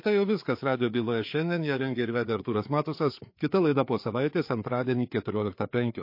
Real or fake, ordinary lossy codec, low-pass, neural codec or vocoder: fake; MP3, 32 kbps; 5.4 kHz; codec, 16 kHz, 4 kbps, FreqCodec, larger model